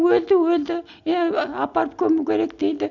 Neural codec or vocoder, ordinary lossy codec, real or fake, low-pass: none; none; real; 7.2 kHz